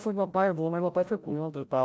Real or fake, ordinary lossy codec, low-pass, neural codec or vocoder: fake; none; none; codec, 16 kHz, 0.5 kbps, FreqCodec, larger model